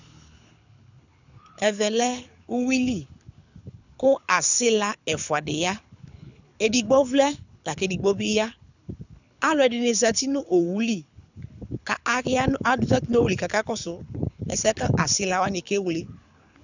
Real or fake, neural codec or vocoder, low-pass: fake; codec, 24 kHz, 6 kbps, HILCodec; 7.2 kHz